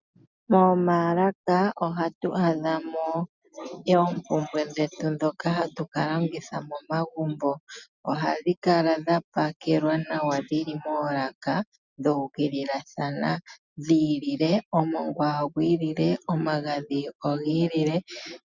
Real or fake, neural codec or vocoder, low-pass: real; none; 7.2 kHz